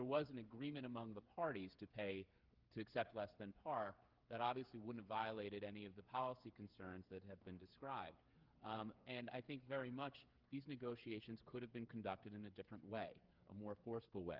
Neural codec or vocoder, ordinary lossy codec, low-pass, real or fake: codec, 16 kHz, 8 kbps, FreqCodec, smaller model; Opus, 32 kbps; 5.4 kHz; fake